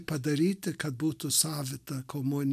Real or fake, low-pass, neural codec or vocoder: real; 14.4 kHz; none